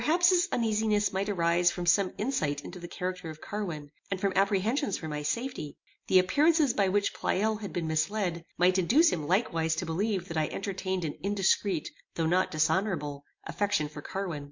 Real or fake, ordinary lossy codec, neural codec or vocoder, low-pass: real; MP3, 48 kbps; none; 7.2 kHz